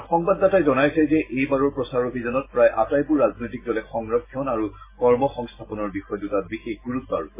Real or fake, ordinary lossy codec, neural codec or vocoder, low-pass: real; MP3, 16 kbps; none; 3.6 kHz